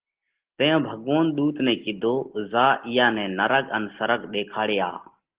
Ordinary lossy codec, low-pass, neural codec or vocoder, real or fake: Opus, 16 kbps; 3.6 kHz; none; real